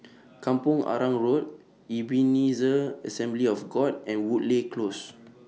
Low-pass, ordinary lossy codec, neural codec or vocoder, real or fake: none; none; none; real